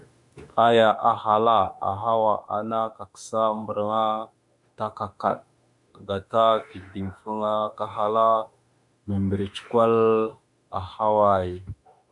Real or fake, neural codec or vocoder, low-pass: fake; autoencoder, 48 kHz, 32 numbers a frame, DAC-VAE, trained on Japanese speech; 10.8 kHz